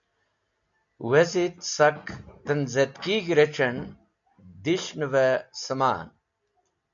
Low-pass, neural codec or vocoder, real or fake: 7.2 kHz; none; real